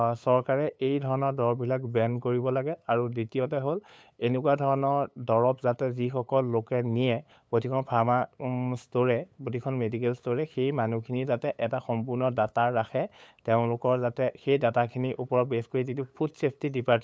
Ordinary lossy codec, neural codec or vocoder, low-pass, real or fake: none; codec, 16 kHz, 8 kbps, FunCodec, trained on LibriTTS, 25 frames a second; none; fake